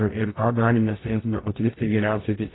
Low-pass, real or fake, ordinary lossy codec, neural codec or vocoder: 7.2 kHz; fake; AAC, 16 kbps; codec, 44.1 kHz, 0.9 kbps, DAC